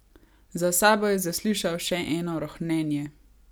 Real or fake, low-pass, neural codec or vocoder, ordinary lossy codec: real; none; none; none